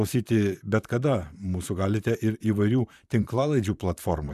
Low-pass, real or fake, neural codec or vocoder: 14.4 kHz; fake; vocoder, 44.1 kHz, 128 mel bands, Pupu-Vocoder